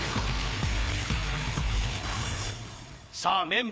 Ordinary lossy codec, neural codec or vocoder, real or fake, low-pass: none; codec, 16 kHz, 4 kbps, FreqCodec, larger model; fake; none